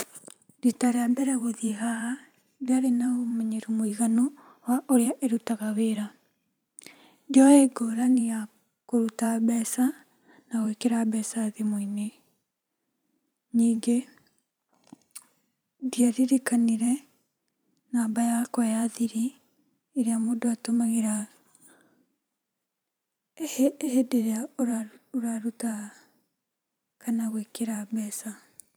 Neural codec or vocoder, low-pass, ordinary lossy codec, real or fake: vocoder, 44.1 kHz, 128 mel bands every 512 samples, BigVGAN v2; none; none; fake